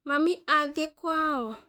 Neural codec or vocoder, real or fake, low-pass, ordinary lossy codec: autoencoder, 48 kHz, 32 numbers a frame, DAC-VAE, trained on Japanese speech; fake; 19.8 kHz; MP3, 96 kbps